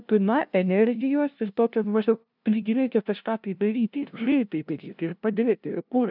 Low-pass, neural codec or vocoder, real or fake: 5.4 kHz; codec, 16 kHz, 0.5 kbps, FunCodec, trained on LibriTTS, 25 frames a second; fake